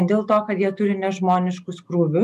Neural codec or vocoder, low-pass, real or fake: none; 14.4 kHz; real